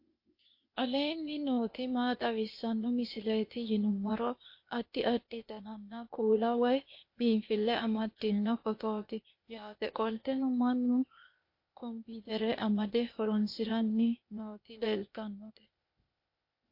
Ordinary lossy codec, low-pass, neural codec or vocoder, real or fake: MP3, 32 kbps; 5.4 kHz; codec, 16 kHz, 0.8 kbps, ZipCodec; fake